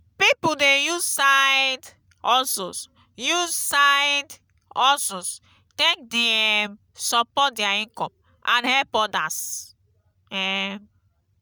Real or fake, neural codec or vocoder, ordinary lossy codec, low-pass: real; none; none; none